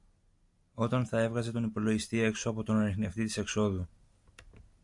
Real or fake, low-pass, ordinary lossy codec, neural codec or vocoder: real; 10.8 kHz; AAC, 64 kbps; none